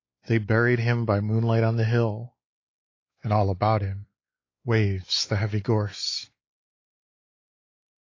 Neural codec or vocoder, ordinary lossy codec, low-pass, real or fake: codec, 16 kHz, 4 kbps, X-Codec, WavLM features, trained on Multilingual LibriSpeech; AAC, 32 kbps; 7.2 kHz; fake